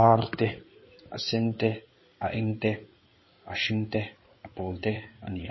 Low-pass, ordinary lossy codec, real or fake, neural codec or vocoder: 7.2 kHz; MP3, 24 kbps; fake; codec, 16 kHz in and 24 kHz out, 2.2 kbps, FireRedTTS-2 codec